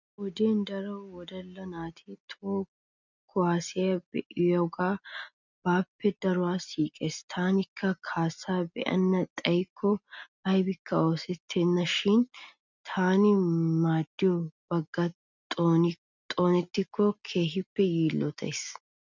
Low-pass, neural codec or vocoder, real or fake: 7.2 kHz; none; real